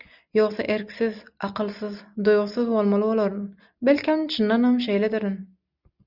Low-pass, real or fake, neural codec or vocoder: 5.4 kHz; real; none